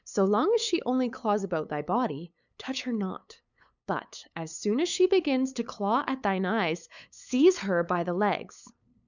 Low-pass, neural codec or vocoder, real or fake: 7.2 kHz; codec, 16 kHz, 8 kbps, FunCodec, trained on LibriTTS, 25 frames a second; fake